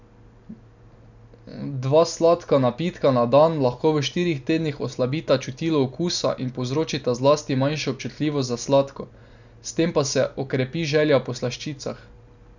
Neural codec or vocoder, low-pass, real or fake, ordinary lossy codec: none; 7.2 kHz; real; none